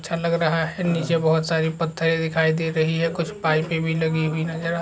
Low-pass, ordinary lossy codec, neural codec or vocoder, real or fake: none; none; none; real